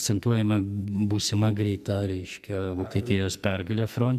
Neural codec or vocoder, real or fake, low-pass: codec, 44.1 kHz, 2.6 kbps, SNAC; fake; 14.4 kHz